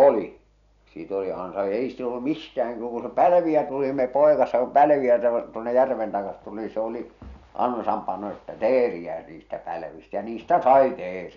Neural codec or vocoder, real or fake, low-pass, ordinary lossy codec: none; real; 7.2 kHz; MP3, 96 kbps